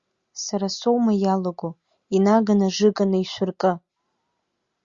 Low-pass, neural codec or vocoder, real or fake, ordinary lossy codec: 7.2 kHz; none; real; Opus, 64 kbps